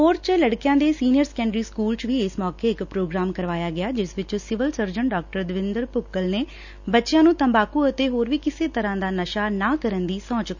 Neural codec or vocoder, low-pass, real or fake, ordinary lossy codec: none; 7.2 kHz; real; none